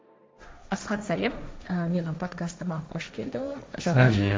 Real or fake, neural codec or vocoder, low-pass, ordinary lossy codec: fake; codec, 16 kHz, 1.1 kbps, Voila-Tokenizer; none; none